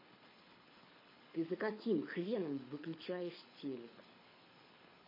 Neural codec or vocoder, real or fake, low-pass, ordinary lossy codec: codec, 24 kHz, 6 kbps, HILCodec; fake; 5.4 kHz; MP3, 24 kbps